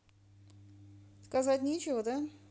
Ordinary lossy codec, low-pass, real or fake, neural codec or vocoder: none; none; real; none